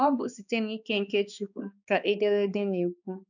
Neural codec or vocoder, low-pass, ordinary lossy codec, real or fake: codec, 16 kHz, 2 kbps, X-Codec, HuBERT features, trained on balanced general audio; 7.2 kHz; none; fake